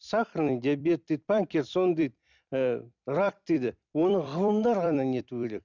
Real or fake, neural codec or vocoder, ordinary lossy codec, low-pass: fake; vocoder, 44.1 kHz, 128 mel bands every 256 samples, BigVGAN v2; none; 7.2 kHz